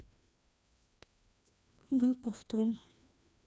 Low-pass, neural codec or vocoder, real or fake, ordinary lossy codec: none; codec, 16 kHz, 1 kbps, FreqCodec, larger model; fake; none